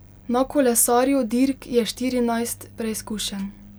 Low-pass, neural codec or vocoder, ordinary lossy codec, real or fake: none; none; none; real